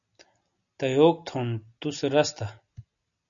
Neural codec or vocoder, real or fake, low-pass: none; real; 7.2 kHz